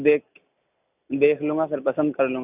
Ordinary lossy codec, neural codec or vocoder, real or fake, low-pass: none; none; real; 3.6 kHz